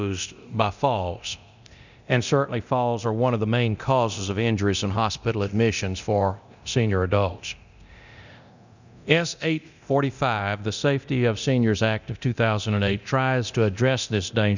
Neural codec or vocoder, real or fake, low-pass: codec, 24 kHz, 0.9 kbps, DualCodec; fake; 7.2 kHz